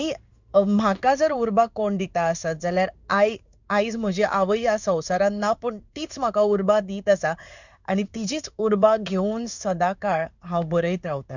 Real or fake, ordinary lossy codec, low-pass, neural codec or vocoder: fake; none; 7.2 kHz; codec, 16 kHz in and 24 kHz out, 1 kbps, XY-Tokenizer